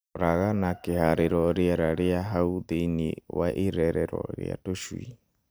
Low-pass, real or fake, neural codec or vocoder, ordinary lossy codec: none; real; none; none